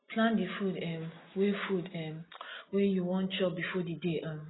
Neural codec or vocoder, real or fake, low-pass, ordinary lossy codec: none; real; 7.2 kHz; AAC, 16 kbps